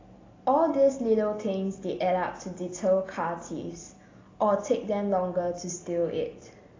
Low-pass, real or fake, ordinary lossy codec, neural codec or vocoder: 7.2 kHz; real; AAC, 32 kbps; none